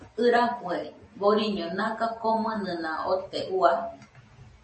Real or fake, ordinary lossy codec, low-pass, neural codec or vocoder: real; MP3, 32 kbps; 10.8 kHz; none